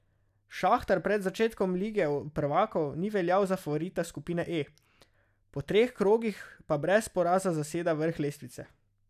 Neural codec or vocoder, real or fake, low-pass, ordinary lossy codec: none; real; 14.4 kHz; none